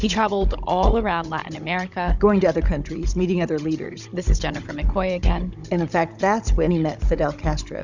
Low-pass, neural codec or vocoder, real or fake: 7.2 kHz; codec, 16 kHz, 16 kbps, FunCodec, trained on Chinese and English, 50 frames a second; fake